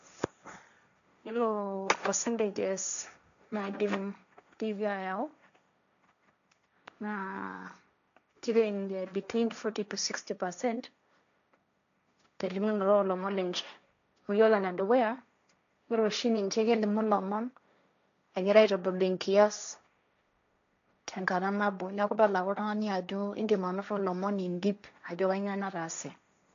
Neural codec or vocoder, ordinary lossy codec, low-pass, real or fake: codec, 16 kHz, 1.1 kbps, Voila-Tokenizer; none; 7.2 kHz; fake